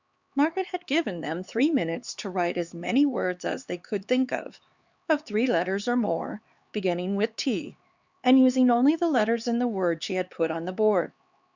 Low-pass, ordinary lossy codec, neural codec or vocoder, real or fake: 7.2 kHz; Opus, 64 kbps; codec, 16 kHz, 4 kbps, X-Codec, HuBERT features, trained on LibriSpeech; fake